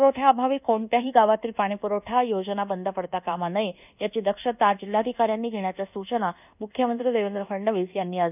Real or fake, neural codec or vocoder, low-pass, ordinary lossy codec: fake; codec, 24 kHz, 1.2 kbps, DualCodec; 3.6 kHz; none